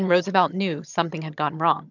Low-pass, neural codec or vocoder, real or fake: 7.2 kHz; vocoder, 22.05 kHz, 80 mel bands, HiFi-GAN; fake